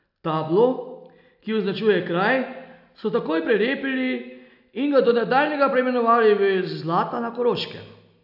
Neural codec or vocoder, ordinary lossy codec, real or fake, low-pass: none; none; real; 5.4 kHz